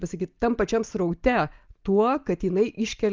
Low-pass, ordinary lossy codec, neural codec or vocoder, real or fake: 7.2 kHz; Opus, 24 kbps; none; real